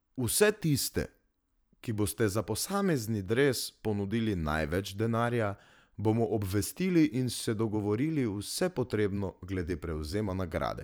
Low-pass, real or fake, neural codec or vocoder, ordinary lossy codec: none; fake; vocoder, 44.1 kHz, 128 mel bands every 512 samples, BigVGAN v2; none